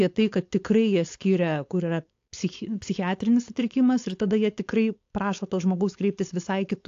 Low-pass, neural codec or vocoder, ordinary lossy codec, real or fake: 7.2 kHz; codec, 16 kHz, 4.8 kbps, FACodec; AAC, 48 kbps; fake